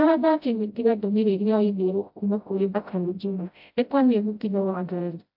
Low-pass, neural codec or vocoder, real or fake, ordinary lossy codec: 5.4 kHz; codec, 16 kHz, 0.5 kbps, FreqCodec, smaller model; fake; AAC, 48 kbps